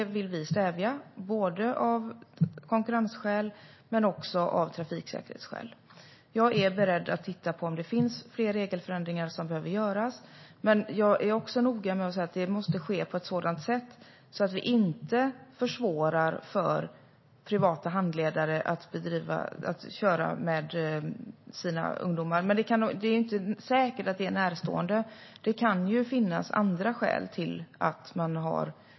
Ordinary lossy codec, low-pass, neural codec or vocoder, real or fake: MP3, 24 kbps; 7.2 kHz; none; real